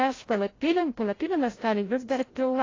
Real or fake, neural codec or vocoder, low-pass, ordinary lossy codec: fake; codec, 16 kHz, 0.5 kbps, FreqCodec, larger model; 7.2 kHz; AAC, 32 kbps